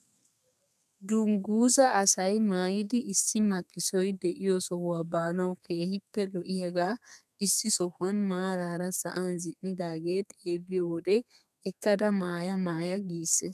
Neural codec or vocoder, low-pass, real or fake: codec, 32 kHz, 1.9 kbps, SNAC; 14.4 kHz; fake